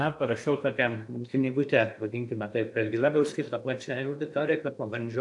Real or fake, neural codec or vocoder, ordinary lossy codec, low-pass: fake; codec, 16 kHz in and 24 kHz out, 0.8 kbps, FocalCodec, streaming, 65536 codes; AAC, 64 kbps; 10.8 kHz